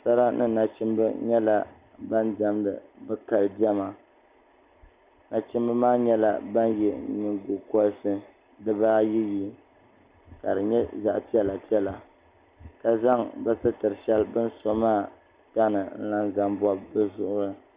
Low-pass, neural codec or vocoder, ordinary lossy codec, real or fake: 3.6 kHz; none; Opus, 64 kbps; real